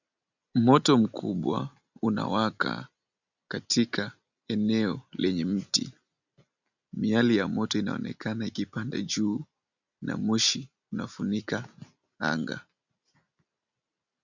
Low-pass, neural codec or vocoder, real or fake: 7.2 kHz; none; real